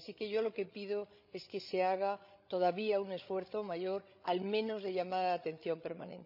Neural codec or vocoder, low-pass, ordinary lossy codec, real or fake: codec, 16 kHz, 16 kbps, FreqCodec, larger model; 5.4 kHz; none; fake